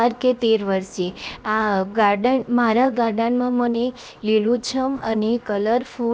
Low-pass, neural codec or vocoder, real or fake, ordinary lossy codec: none; codec, 16 kHz, 0.7 kbps, FocalCodec; fake; none